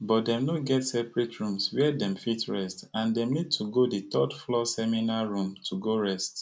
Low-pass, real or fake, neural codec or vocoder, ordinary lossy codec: none; real; none; none